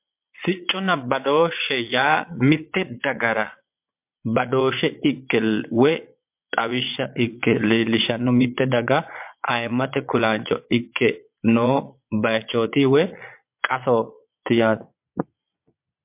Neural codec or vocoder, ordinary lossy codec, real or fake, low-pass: vocoder, 22.05 kHz, 80 mel bands, Vocos; MP3, 32 kbps; fake; 3.6 kHz